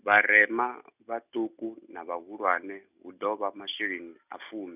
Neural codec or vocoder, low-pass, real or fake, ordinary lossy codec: none; 3.6 kHz; real; none